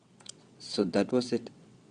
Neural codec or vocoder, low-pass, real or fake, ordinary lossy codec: vocoder, 22.05 kHz, 80 mel bands, WaveNeXt; 9.9 kHz; fake; Opus, 64 kbps